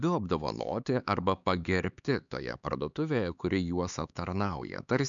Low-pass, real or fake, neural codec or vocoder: 7.2 kHz; fake; codec, 16 kHz, 4 kbps, X-Codec, HuBERT features, trained on LibriSpeech